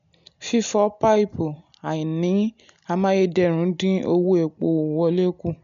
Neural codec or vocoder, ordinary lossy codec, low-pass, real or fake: none; none; 7.2 kHz; real